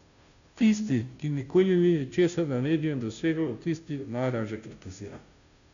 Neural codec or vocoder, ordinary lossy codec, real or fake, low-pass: codec, 16 kHz, 0.5 kbps, FunCodec, trained on Chinese and English, 25 frames a second; none; fake; 7.2 kHz